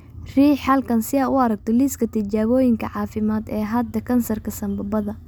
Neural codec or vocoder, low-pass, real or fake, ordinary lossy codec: none; none; real; none